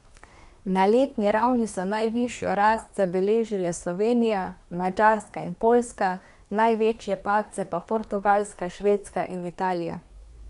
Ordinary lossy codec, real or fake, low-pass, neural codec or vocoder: none; fake; 10.8 kHz; codec, 24 kHz, 1 kbps, SNAC